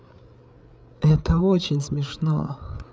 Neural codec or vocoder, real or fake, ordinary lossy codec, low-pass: codec, 16 kHz, 8 kbps, FreqCodec, larger model; fake; none; none